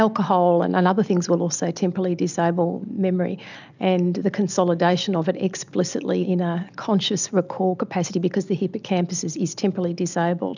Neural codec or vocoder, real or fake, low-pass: none; real; 7.2 kHz